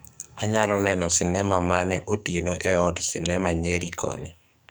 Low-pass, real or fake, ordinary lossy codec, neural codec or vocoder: none; fake; none; codec, 44.1 kHz, 2.6 kbps, SNAC